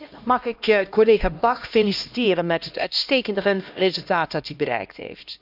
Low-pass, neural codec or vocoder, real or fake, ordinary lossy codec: 5.4 kHz; codec, 16 kHz, 1 kbps, X-Codec, HuBERT features, trained on LibriSpeech; fake; none